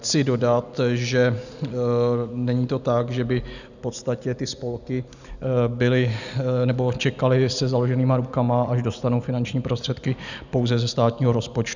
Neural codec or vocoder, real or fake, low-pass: none; real; 7.2 kHz